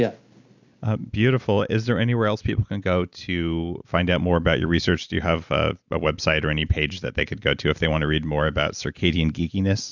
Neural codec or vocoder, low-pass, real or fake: none; 7.2 kHz; real